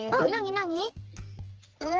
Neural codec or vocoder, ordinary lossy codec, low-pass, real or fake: codec, 16 kHz, 4 kbps, X-Codec, HuBERT features, trained on general audio; Opus, 16 kbps; 7.2 kHz; fake